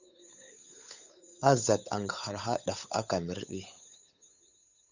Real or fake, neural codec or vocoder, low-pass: fake; codec, 16 kHz, 8 kbps, FunCodec, trained on Chinese and English, 25 frames a second; 7.2 kHz